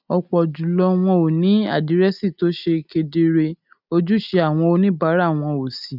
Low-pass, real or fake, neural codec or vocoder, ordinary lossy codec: 5.4 kHz; real; none; none